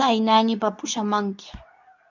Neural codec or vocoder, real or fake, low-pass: codec, 24 kHz, 0.9 kbps, WavTokenizer, medium speech release version 2; fake; 7.2 kHz